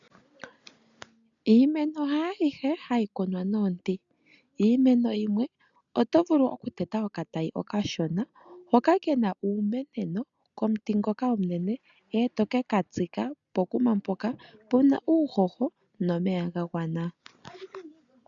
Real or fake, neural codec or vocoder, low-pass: real; none; 7.2 kHz